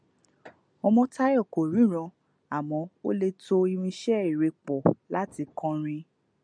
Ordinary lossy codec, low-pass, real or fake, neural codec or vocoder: MP3, 96 kbps; 9.9 kHz; real; none